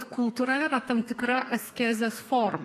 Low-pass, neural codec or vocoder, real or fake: 14.4 kHz; codec, 32 kHz, 1.9 kbps, SNAC; fake